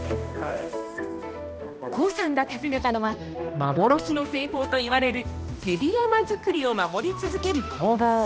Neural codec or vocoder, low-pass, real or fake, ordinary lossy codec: codec, 16 kHz, 1 kbps, X-Codec, HuBERT features, trained on balanced general audio; none; fake; none